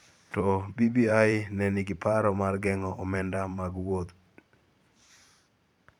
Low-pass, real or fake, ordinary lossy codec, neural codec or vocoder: 19.8 kHz; fake; none; vocoder, 44.1 kHz, 128 mel bands every 512 samples, BigVGAN v2